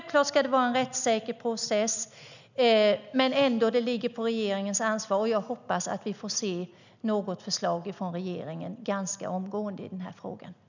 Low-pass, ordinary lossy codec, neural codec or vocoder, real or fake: 7.2 kHz; none; none; real